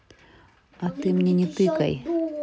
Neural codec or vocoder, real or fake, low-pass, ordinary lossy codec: none; real; none; none